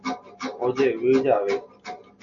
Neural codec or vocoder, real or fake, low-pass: none; real; 7.2 kHz